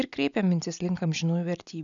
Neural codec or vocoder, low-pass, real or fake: none; 7.2 kHz; real